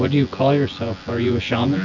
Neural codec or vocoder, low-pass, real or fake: vocoder, 24 kHz, 100 mel bands, Vocos; 7.2 kHz; fake